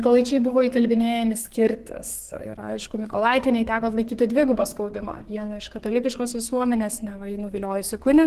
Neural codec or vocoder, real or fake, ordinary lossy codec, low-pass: codec, 32 kHz, 1.9 kbps, SNAC; fake; Opus, 16 kbps; 14.4 kHz